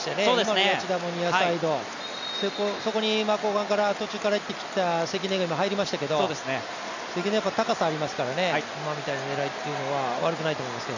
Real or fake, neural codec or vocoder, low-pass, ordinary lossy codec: real; none; 7.2 kHz; none